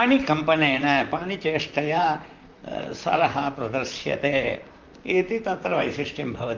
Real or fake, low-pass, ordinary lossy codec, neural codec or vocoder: fake; 7.2 kHz; Opus, 32 kbps; vocoder, 44.1 kHz, 128 mel bands, Pupu-Vocoder